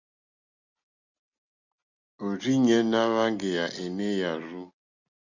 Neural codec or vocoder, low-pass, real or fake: none; 7.2 kHz; real